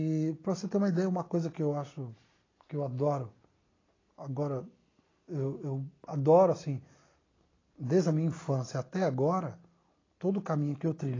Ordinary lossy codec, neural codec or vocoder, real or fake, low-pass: AAC, 32 kbps; none; real; 7.2 kHz